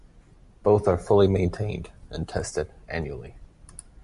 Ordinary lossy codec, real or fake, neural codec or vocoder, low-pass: MP3, 64 kbps; real; none; 10.8 kHz